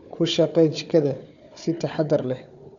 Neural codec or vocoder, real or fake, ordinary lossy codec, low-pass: codec, 16 kHz, 4 kbps, FunCodec, trained on Chinese and English, 50 frames a second; fake; none; 7.2 kHz